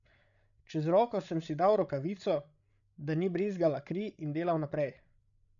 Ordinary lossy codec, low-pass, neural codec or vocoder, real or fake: none; 7.2 kHz; codec, 16 kHz, 16 kbps, FreqCodec, larger model; fake